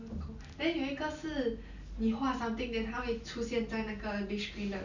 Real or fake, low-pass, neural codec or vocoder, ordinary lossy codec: real; 7.2 kHz; none; none